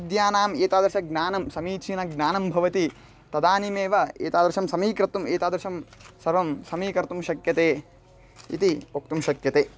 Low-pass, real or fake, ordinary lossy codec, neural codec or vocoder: none; real; none; none